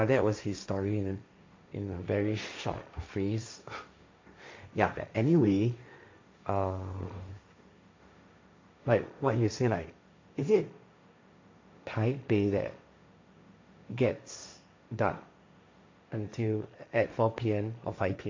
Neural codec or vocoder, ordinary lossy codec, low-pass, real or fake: codec, 16 kHz, 1.1 kbps, Voila-Tokenizer; MP3, 48 kbps; 7.2 kHz; fake